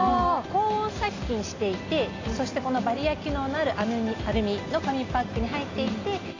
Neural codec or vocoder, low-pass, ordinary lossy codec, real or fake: none; 7.2 kHz; AAC, 32 kbps; real